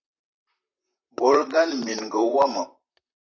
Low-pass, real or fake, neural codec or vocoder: 7.2 kHz; fake; vocoder, 44.1 kHz, 128 mel bands, Pupu-Vocoder